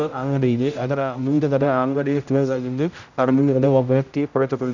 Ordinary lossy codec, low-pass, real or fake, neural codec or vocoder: none; 7.2 kHz; fake; codec, 16 kHz, 0.5 kbps, X-Codec, HuBERT features, trained on general audio